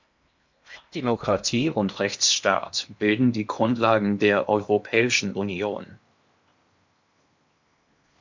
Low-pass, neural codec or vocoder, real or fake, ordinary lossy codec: 7.2 kHz; codec, 16 kHz in and 24 kHz out, 0.8 kbps, FocalCodec, streaming, 65536 codes; fake; MP3, 64 kbps